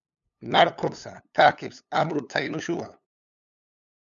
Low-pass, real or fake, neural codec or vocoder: 7.2 kHz; fake; codec, 16 kHz, 8 kbps, FunCodec, trained on LibriTTS, 25 frames a second